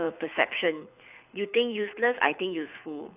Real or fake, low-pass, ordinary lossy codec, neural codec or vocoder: fake; 3.6 kHz; none; codec, 24 kHz, 6 kbps, HILCodec